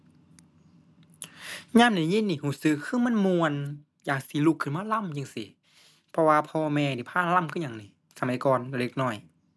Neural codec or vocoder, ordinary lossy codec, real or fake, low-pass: none; none; real; none